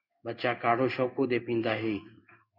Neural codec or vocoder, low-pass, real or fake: codec, 16 kHz in and 24 kHz out, 1 kbps, XY-Tokenizer; 5.4 kHz; fake